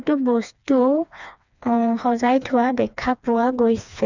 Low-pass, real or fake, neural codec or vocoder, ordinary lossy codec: 7.2 kHz; fake; codec, 16 kHz, 2 kbps, FreqCodec, smaller model; none